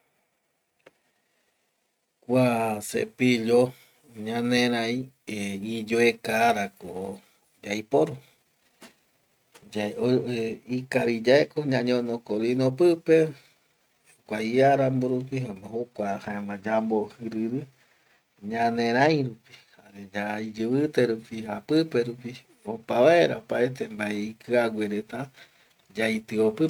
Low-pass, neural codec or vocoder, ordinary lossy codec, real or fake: 19.8 kHz; none; none; real